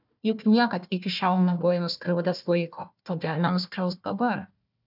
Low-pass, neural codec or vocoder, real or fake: 5.4 kHz; codec, 16 kHz, 1 kbps, FunCodec, trained on Chinese and English, 50 frames a second; fake